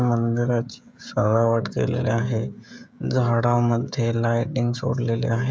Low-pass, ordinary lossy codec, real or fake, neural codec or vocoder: none; none; fake; codec, 16 kHz, 16 kbps, FreqCodec, smaller model